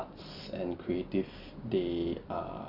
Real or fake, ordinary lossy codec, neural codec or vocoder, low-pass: real; none; none; 5.4 kHz